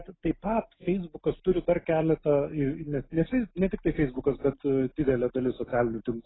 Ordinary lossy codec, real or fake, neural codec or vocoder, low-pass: AAC, 16 kbps; real; none; 7.2 kHz